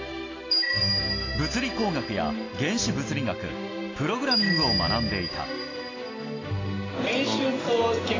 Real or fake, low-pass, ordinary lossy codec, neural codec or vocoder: real; 7.2 kHz; AAC, 32 kbps; none